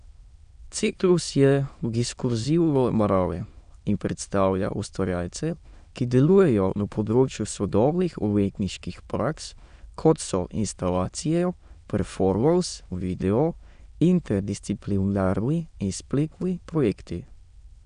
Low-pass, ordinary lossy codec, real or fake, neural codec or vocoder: 9.9 kHz; none; fake; autoencoder, 22.05 kHz, a latent of 192 numbers a frame, VITS, trained on many speakers